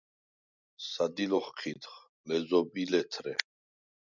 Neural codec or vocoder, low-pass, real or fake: none; 7.2 kHz; real